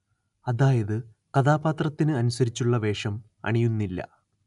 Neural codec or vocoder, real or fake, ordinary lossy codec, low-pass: none; real; none; 10.8 kHz